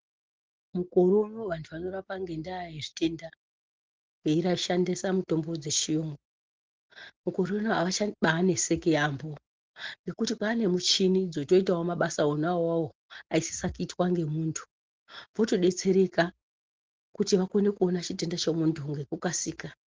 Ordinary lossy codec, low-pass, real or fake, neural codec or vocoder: Opus, 16 kbps; 7.2 kHz; real; none